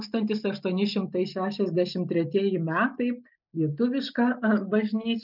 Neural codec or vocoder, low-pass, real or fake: none; 5.4 kHz; real